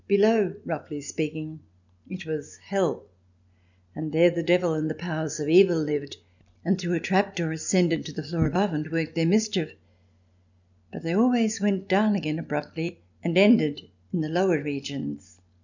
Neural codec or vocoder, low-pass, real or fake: none; 7.2 kHz; real